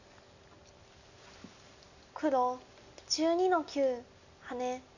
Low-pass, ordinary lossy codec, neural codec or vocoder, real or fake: 7.2 kHz; none; none; real